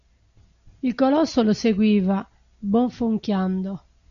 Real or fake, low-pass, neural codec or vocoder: real; 7.2 kHz; none